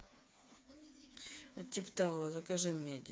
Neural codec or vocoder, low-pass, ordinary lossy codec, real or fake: codec, 16 kHz, 4 kbps, FreqCodec, smaller model; none; none; fake